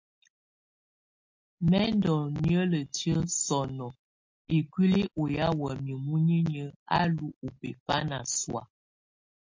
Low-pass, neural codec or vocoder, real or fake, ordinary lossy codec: 7.2 kHz; none; real; MP3, 48 kbps